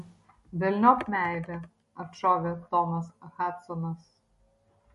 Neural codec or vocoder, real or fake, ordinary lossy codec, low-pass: none; real; MP3, 48 kbps; 14.4 kHz